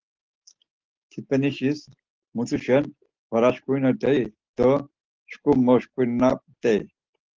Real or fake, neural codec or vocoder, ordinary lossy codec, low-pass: real; none; Opus, 16 kbps; 7.2 kHz